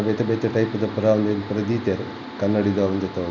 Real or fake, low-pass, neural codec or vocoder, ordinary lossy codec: real; 7.2 kHz; none; none